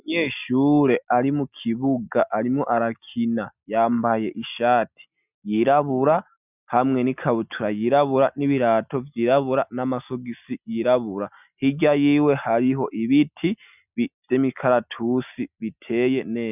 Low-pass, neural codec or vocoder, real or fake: 3.6 kHz; none; real